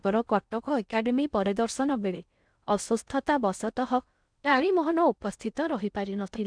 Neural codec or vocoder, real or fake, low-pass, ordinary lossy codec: codec, 16 kHz in and 24 kHz out, 0.6 kbps, FocalCodec, streaming, 4096 codes; fake; 9.9 kHz; none